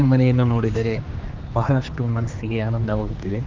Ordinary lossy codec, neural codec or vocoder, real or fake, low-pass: Opus, 24 kbps; codec, 16 kHz, 2 kbps, X-Codec, HuBERT features, trained on general audio; fake; 7.2 kHz